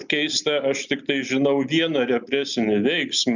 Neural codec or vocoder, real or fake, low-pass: none; real; 7.2 kHz